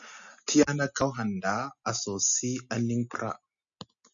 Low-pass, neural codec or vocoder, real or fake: 7.2 kHz; none; real